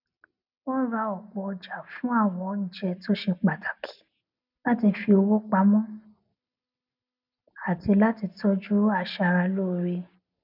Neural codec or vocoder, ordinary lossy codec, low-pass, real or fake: none; none; 5.4 kHz; real